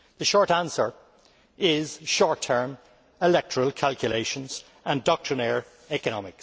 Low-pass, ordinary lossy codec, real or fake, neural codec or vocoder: none; none; real; none